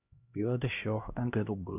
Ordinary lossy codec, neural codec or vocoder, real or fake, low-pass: none; codec, 16 kHz, 1 kbps, X-Codec, HuBERT features, trained on LibriSpeech; fake; 3.6 kHz